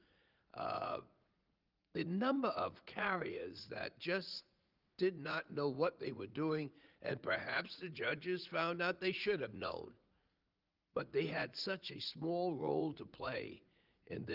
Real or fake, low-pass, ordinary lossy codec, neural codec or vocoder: fake; 5.4 kHz; Opus, 32 kbps; vocoder, 44.1 kHz, 80 mel bands, Vocos